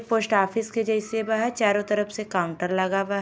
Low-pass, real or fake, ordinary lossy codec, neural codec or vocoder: none; real; none; none